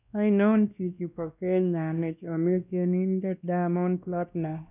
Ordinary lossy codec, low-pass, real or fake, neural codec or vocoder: none; 3.6 kHz; fake; codec, 16 kHz, 1 kbps, X-Codec, WavLM features, trained on Multilingual LibriSpeech